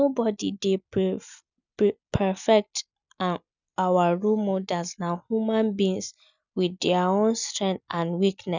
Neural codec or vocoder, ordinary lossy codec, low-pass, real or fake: none; none; 7.2 kHz; real